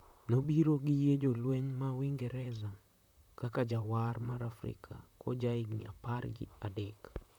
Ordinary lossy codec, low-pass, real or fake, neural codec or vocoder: none; 19.8 kHz; fake; vocoder, 44.1 kHz, 128 mel bands, Pupu-Vocoder